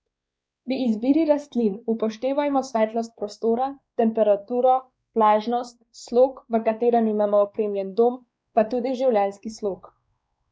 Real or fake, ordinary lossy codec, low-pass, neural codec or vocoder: fake; none; none; codec, 16 kHz, 2 kbps, X-Codec, WavLM features, trained on Multilingual LibriSpeech